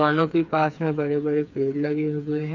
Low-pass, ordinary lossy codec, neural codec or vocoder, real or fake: 7.2 kHz; none; codec, 16 kHz, 4 kbps, FreqCodec, smaller model; fake